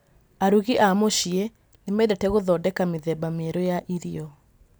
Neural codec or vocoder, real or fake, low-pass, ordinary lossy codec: none; real; none; none